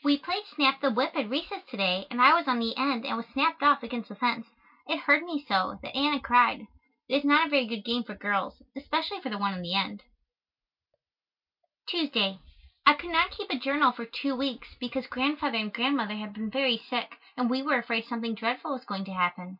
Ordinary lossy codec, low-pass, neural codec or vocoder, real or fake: MP3, 48 kbps; 5.4 kHz; none; real